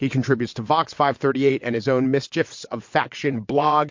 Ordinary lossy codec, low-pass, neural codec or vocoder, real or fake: MP3, 48 kbps; 7.2 kHz; vocoder, 22.05 kHz, 80 mel bands, WaveNeXt; fake